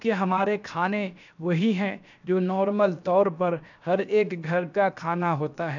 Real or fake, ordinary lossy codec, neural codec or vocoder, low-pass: fake; none; codec, 16 kHz, 0.7 kbps, FocalCodec; 7.2 kHz